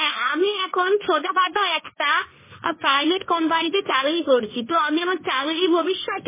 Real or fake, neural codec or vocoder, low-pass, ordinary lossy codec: fake; codec, 16 kHz, 1.1 kbps, Voila-Tokenizer; 3.6 kHz; MP3, 16 kbps